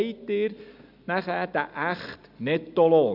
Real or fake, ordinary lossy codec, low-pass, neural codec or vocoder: real; none; 5.4 kHz; none